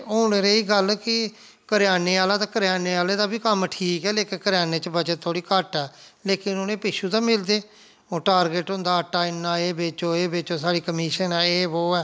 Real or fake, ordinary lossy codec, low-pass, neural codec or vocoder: real; none; none; none